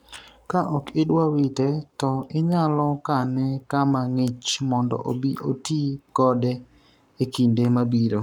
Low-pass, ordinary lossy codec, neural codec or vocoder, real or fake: 19.8 kHz; none; codec, 44.1 kHz, 7.8 kbps, Pupu-Codec; fake